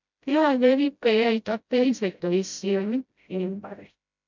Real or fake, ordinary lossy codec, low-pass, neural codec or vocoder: fake; MP3, 64 kbps; 7.2 kHz; codec, 16 kHz, 0.5 kbps, FreqCodec, smaller model